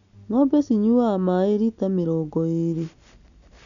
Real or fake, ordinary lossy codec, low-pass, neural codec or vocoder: real; none; 7.2 kHz; none